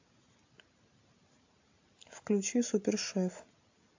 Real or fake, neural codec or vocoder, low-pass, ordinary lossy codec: real; none; 7.2 kHz; AAC, 48 kbps